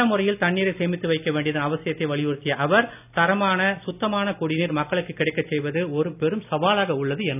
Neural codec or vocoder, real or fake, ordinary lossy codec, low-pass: none; real; none; 3.6 kHz